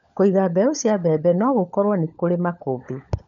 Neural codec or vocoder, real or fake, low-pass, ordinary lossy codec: codec, 16 kHz, 16 kbps, FunCodec, trained on LibriTTS, 50 frames a second; fake; 7.2 kHz; none